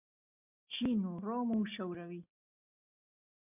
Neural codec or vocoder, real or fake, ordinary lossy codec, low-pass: none; real; MP3, 32 kbps; 3.6 kHz